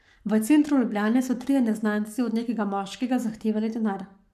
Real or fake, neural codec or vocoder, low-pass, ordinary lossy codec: fake; codec, 44.1 kHz, 7.8 kbps, Pupu-Codec; 14.4 kHz; none